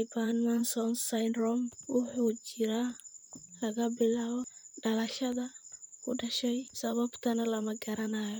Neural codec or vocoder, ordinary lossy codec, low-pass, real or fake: vocoder, 44.1 kHz, 128 mel bands, Pupu-Vocoder; none; none; fake